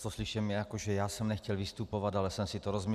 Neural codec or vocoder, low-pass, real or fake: none; 14.4 kHz; real